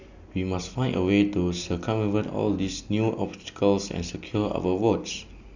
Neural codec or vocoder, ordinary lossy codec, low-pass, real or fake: none; none; 7.2 kHz; real